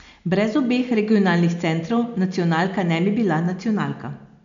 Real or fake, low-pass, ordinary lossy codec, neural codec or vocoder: real; 7.2 kHz; MP3, 64 kbps; none